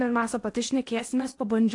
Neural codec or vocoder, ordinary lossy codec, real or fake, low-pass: codec, 16 kHz in and 24 kHz out, 0.8 kbps, FocalCodec, streaming, 65536 codes; AAC, 48 kbps; fake; 10.8 kHz